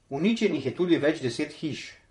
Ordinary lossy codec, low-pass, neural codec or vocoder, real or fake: MP3, 48 kbps; 19.8 kHz; vocoder, 44.1 kHz, 128 mel bands, Pupu-Vocoder; fake